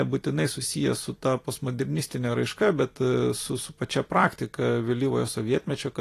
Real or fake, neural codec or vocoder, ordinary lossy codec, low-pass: fake; vocoder, 44.1 kHz, 128 mel bands every 256 samples, BigVGAN v2; AAC, 48 kbps; 14.4 kHz